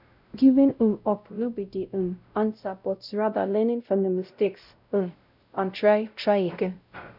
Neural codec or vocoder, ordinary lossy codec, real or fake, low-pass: codec, 16 kHz, 0.5 kbps, X-Codec, WavLM features, trained on Multilingual LibriSpeech; none; fake; 5.4 kHz